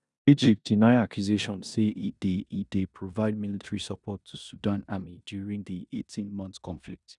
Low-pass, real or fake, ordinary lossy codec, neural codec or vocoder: 10.8 kHz; fake; none; codec, 16 kHz in and 24 kHz out, 0.9 kbps, LongCat-Audio-Codec, four codebook decoder